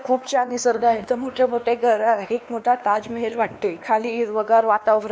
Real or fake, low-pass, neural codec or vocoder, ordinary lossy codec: fake; none; codec, 16 kHz, 2 kbps, X-Codec, WavLM features, trained on Multilingual LibriSpeech; none